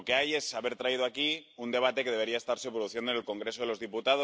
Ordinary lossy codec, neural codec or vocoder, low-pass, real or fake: none; none; none; real